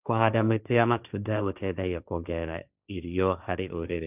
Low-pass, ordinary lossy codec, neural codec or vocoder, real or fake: 3.6 kHz; none; codec, 16 kHz, 1.1 kbps, Voila-Tokenizer; fake